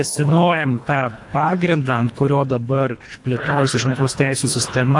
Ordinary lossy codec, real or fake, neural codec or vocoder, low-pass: AAC, 64 kbps; fake; codec, 24 kHz, 1.5 kbps, HILCodec; 10.8 kHz